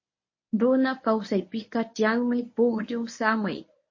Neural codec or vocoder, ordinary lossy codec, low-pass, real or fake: codec, 24 kHz, 0.9 kbps, WavTokenizer, medium speech release version 1; MP3, 32 kbps; 7.2 kHz; fake